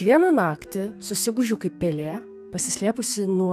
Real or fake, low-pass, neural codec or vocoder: fake; 14.4 kHz; codec, 32 kHz, 1.9 kbps, SNAC